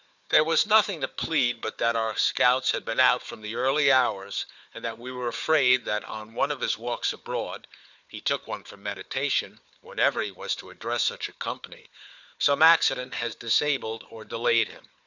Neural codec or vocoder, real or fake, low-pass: codec, 16 kHz, 4 kbps, FunCodec, trained on Chinese and English, 50 frames a second; fake; 7.2 kHz